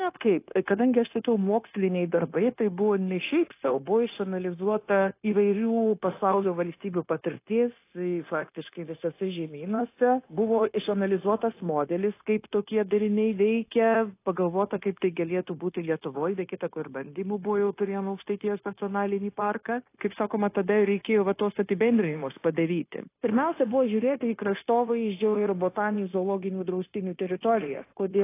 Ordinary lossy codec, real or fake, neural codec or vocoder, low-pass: AAC, 24 kbps; fake; codec, 16 kHz, 0.9 kbps, LongCat-Audio-Codec; 3.6 kHz